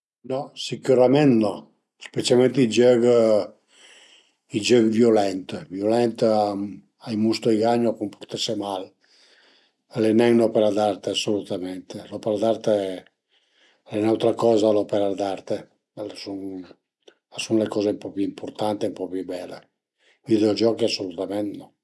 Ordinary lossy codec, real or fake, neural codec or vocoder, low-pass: none; real; none; none